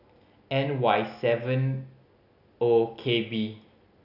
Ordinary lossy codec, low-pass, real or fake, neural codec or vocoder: none; 5.4 kHz; real; none